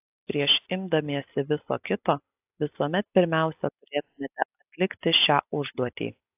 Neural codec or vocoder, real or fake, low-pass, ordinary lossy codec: none; real; 3.6 kHz; AAC, 32 kbps